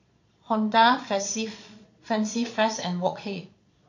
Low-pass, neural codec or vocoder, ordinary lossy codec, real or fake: 7.2 kHz; vocoder, 22.05 kHz, 80 mel bands, Vocos; AAC, 48 kbps; fake